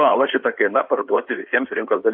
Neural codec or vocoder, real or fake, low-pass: codec, 16 kHz in and 24 kHz out, 2.2 kbps, FireRedTTS-2 codec; fake; 5.4 kHz